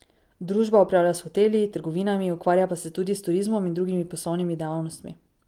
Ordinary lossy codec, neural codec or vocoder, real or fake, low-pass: Opus, 24 kbps; none; real; 19.8 kHz